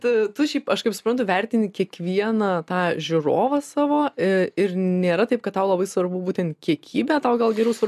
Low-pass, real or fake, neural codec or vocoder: 14.4 kHz; real; none